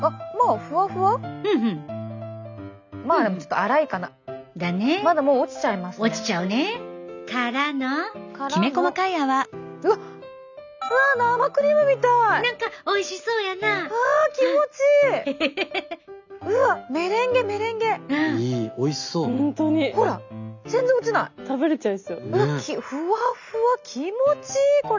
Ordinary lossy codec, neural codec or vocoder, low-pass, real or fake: none; none; 7.2 kHz; real